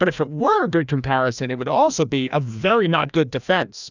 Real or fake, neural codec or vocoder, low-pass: fake; codec, 16 kHz, 1 kbps, FreqCodec, larger model; 7.2 kHz